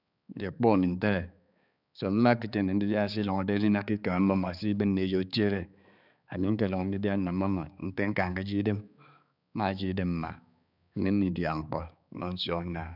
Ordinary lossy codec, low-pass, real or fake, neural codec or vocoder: none; 5.4 kHz; fake; codec, 16 kHz, 4 kbps, X-Codec, HuBERT features, trained on balanced general audio